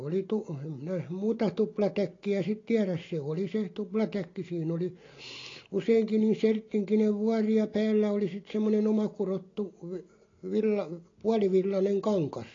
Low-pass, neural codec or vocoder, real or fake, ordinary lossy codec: 7.2 kHz; none; real; AAC, 32 kbps